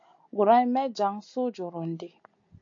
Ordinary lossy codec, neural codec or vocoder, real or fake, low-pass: AAC, 48 kbps; none; real; 7.2 kHz